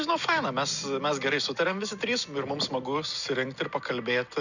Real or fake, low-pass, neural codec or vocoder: real; 7.2 kHz; none